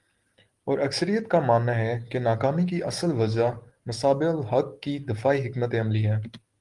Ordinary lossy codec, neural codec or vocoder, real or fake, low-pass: Opus, 24 kbps; none; real; 10.8 kHz